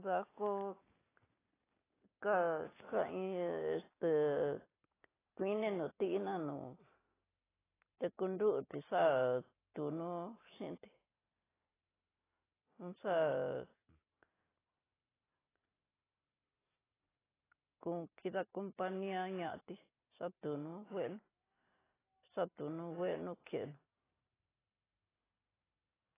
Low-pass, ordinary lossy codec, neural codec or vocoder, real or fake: 3.6 kHz; AAC, 16 kbps; none; real